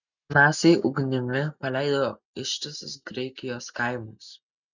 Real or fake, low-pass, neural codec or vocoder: real; 7.2 kHz; none